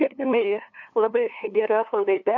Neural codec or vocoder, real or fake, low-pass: codec, 16 kHz, 2 kbps, FunCodec, trained on LibriTTS, 25 frames a second; fake; 7.2 kHz